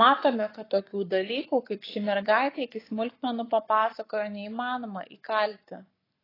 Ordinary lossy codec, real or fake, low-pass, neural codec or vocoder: AAC, 24 kbps; fake; 5.4 kHz; codec, 44.1 kHz, 7.8 kbps, Pupu-Codec